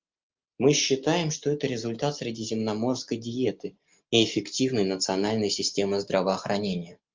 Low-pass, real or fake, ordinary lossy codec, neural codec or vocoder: 7.2 kHz; real; Opus, 24 kbps; none